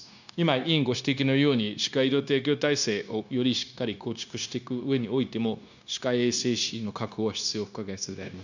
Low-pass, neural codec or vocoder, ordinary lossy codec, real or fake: 7.2 kHz; codec, 16 kHz, 0.9 kbps, LongCat-Audio-Codec; none; fake